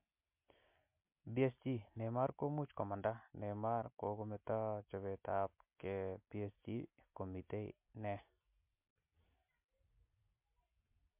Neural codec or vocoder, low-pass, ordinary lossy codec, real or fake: none; 3.6 kHz; MP3, 32 kbps; real